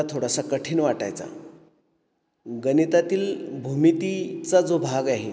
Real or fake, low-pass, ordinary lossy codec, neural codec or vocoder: real; none; none; none